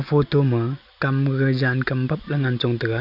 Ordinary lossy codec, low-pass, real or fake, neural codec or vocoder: none; 5.4 kHz; real; none